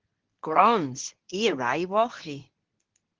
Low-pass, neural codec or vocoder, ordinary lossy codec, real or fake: 7.2 kHz; codec, 24 kHz, 0.9 kbps, WavTokenizer, medium speech release version 2; Opus, 16 kbps; fake